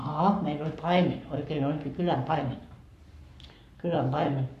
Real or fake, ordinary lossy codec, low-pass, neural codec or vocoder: fake; AAC, 96 kbps; 14.4 kHz; codec, 44.1 kHz, 7.8 kbps, Pupu-Codec